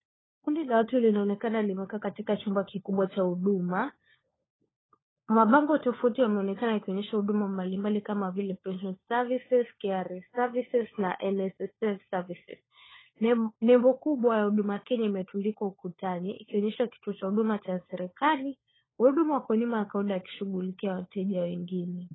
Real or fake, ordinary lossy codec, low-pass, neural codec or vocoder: fake; AAC, 16 kbps; 7.2 kHz; codec, 16 kHz, 4 kbps, FunCodec, trained on LibriTTS, 50 frames a second